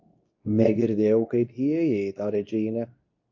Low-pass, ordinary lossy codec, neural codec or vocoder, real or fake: 7.2 kHz; AAC, 48 kbps; codec, 24 kHz, 0.9 kbps, WavTokenizer, medium speech release version 2; fake